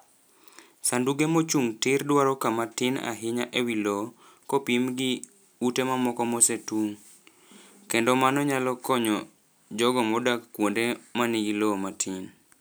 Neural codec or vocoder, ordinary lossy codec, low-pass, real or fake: none; none; none; real